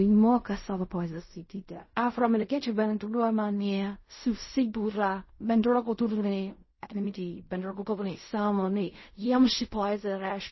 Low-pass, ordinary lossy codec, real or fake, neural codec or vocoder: 7.2 kHz; MP3, 24 kbps; fake; codec, 16 kHz in and 24 kHz out, 0.4 kbps, LongCat-Audio-Codec, fine tuned four codebook decoder